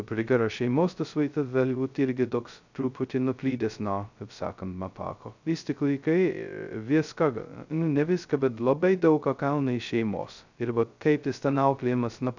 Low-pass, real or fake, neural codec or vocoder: 7.2 kHz; fake; codec, 16 kHz, 0.2 kbps, FocalCodec